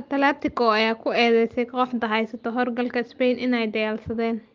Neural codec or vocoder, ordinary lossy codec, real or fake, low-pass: none; Opus, 32 kbps; real; 7.2 kHz